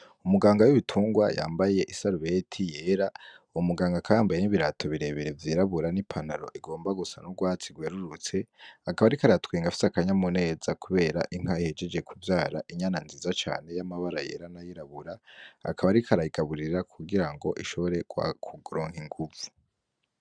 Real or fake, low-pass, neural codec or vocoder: real; 9.9 kHz; none